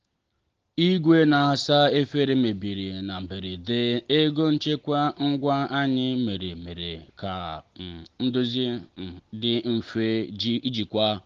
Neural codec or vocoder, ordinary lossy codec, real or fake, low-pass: none; Opus, 16 kbps; real; 7.2 kHz